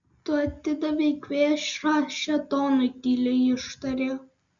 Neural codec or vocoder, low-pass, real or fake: none; 7.2 kHz; real